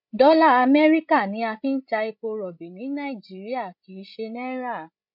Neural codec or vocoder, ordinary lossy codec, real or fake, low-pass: codec, 16 kHz, 16 kbps, FreqCodec, larger model; none; fake; 5.4 kHz